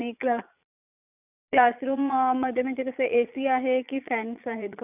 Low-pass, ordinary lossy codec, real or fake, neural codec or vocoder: 3.6 kHz; none; fake; vocoder, 22.05 kHz, 80 mel bands, Vocos